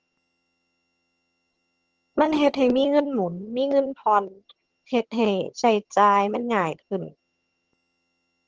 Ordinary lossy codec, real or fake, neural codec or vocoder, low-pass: Opus, 16 kbps; fake; vocoder, 22.05 kHz, 80 mel bands, HiFi-GAN; 7.2 kHz